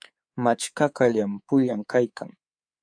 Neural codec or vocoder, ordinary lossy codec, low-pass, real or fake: codec, 24 kHz, 3.1 kbps, DualCodec; AAC, 48 kbps; 9.9 kHz; fake